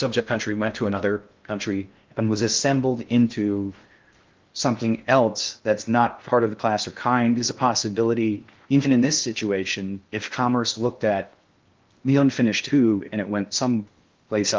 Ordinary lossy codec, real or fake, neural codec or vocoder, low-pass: Opus, 24 kbps; fake; codec, 16 kHz in and 24 kHz out, 0.6 kbps, FocalCodec, streaming, 2048 codes; 7.2 kHz